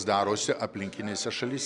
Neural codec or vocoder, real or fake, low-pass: none; real; 10.8 kHz